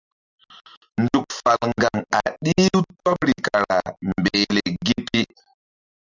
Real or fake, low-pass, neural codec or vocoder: real; 7.2 kHz; none